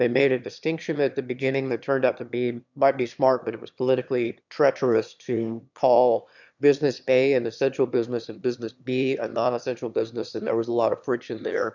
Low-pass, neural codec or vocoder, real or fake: 7.2 kHz; autoencoder, 22.05 kHz, a latent of 192 numbers a frame, VITS, trained on one speaker; fake